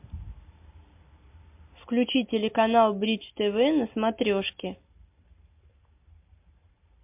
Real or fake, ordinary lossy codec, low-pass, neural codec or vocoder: real; MP3, 32 kbps; 3.6 kHz; none